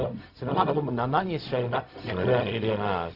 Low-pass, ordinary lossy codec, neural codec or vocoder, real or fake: 5.4 kHz; none; codec, 16 kHz, 0.4 kbps, LongCat-Audio-Codec; fake